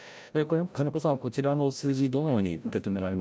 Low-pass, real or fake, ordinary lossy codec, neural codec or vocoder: none; fake; none; codec, 16 kHz, 0.5 kbps, FreqCodec, larger model